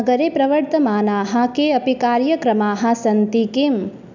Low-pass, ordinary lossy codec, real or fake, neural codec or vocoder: 7.2 kHz; none; real; none